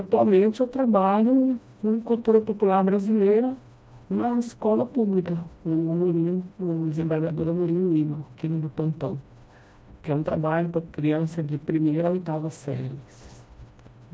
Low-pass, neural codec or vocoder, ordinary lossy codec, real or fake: none; codec, 16 kHz, 1 kbps, FreqCodec, smaller model; none; fake